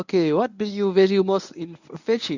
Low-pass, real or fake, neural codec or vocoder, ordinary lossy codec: 7.2 kHz; fake; codec, 24 kHz, 0.9 kbps, WavTokenizer, medium speech release version 1; none